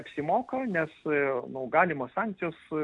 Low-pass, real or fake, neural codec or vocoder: 10.8 kHz; real; none